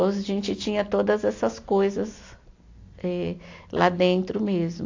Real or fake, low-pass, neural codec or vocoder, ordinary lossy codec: real; 7.2 kHz; none; AAC, 48 kbps